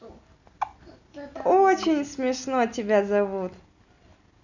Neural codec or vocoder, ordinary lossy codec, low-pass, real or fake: none; none; 7.2 kHz; real